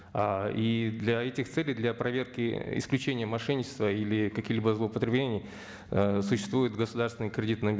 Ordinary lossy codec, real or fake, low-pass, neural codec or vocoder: none; real; none; none